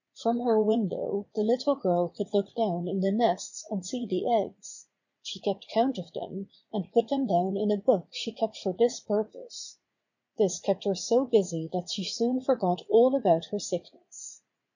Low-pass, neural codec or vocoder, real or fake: 7.2 kHz; vocoder, 44.1 kHz, 80 mel bands, Vocos; fake